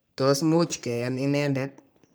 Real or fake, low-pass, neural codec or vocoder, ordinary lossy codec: fake; none; codec, 44.1 kHz, 3.4 kbps, Pupu-Codec; none